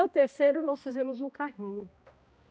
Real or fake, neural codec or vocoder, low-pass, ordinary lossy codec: fake; codec, 16 kHz, 1 kbps, X-Codec, HuBERT features, trained on balanced general audio; none; none